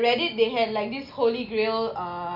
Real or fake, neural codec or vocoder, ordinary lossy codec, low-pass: real; none; none; 5.4 kHz